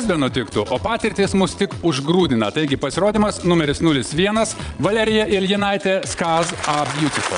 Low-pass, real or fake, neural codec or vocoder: 9.9 kHz; fake; vocoder, 22.05 kHz, 80 mel bands, Vocos